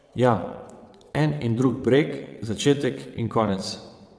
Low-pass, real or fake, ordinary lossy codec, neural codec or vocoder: none; fake; none; vocoder, 22.05 kHz, 80 mel bands, WaveNeXt